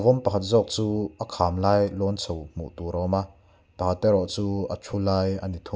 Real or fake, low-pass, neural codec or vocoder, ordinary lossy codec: real; none; none; none